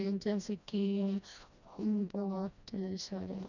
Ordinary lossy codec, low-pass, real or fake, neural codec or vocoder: none; 7.2 kHz; fake; codec, 16 kHz, 1 kbps, FreqCodec, smaller model